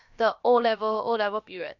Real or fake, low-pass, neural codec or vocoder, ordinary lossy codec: fake; 7.2 kHz; codec, 16 kHz, about 1 kbps, DyCAST, with the encoder's durations; none